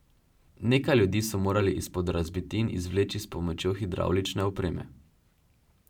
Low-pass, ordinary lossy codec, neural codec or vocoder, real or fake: 19.8 kHz; none; vocoder, 44.1 kHz, 128 mel bands every 512 samples, BigVGAN v2; fake